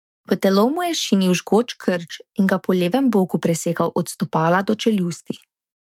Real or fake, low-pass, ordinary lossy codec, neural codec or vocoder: fake; 19.8 kHz; MP3, 96 kbps; codec, 44.1 kHz, 7.8 kbps, DAC